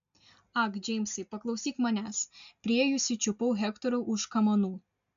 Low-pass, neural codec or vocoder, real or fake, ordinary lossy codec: 7.2 kHz; none; real; MP3, 64 kbps